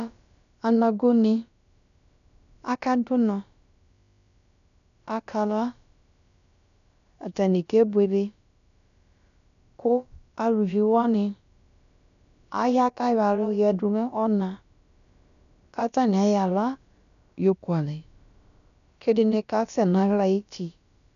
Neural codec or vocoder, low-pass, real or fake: codec, 16 kHz, about 1 kbps, DyCAST, with the encoder's durations; 7.2 kHz; fake